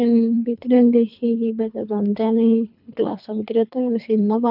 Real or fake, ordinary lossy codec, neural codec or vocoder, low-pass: fake; none; codec, 24 kHz, 3 kbps, HILCodec; 5.4 kHz